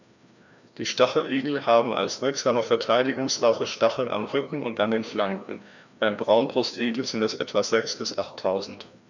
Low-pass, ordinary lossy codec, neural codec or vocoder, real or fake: 7.2 kHz; none; codec, 16 kHz, 1 kbps, FreqCodec, larger model; fake